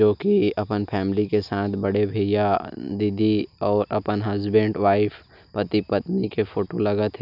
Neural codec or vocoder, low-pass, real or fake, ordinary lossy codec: none; 5.4 kHz; real; none